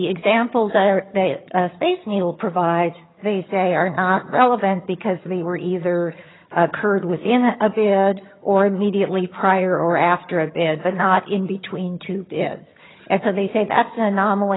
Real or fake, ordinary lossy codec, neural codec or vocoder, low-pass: fake; AAC, 16 kbps; vocoder, 22.05 kHz, 80 mel bands, HiFi-GAN; 7.2 kHz